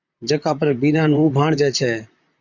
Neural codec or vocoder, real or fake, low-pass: vocoder, 44.1 kHz, 128 mel bands, Pupu-Vocoder; fake; 7.2 kHz